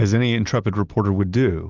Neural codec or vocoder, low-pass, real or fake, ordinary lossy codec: none; 7.2 kHz; real; Opus, 32 kbps